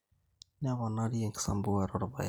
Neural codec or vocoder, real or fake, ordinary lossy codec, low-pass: none; real; none; none